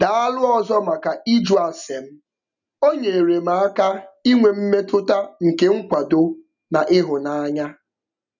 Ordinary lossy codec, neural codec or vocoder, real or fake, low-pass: none; none; real; 7.2 kHz